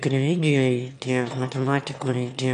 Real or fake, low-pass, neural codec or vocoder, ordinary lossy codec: fake; 9.9 kHz; autoencoder, 22.05 kHz, a latent of 192 numbers a frame, VITS, trained on one speaker; MP3, 96 kbps